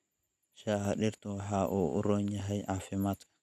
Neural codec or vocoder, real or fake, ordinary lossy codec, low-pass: none; real; none; 14.4 kHz